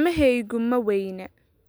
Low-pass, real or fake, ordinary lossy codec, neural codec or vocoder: none; real; none; none